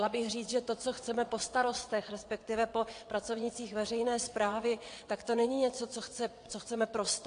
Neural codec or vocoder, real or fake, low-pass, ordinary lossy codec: vocoder, 22.05 kHz, 80 mel bands, WaveNeXt; fake; 9.9 kHz; AAC, 48 kbps